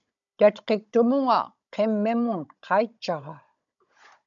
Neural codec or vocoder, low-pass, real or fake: codec, 16 kHz, 16 kbps, FunCodec, trained on Chinese and English, 50 frames a second; 7.2 kHz; fake